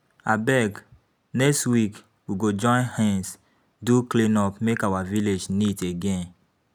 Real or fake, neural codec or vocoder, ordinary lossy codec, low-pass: real; none; none; 19.8 kHz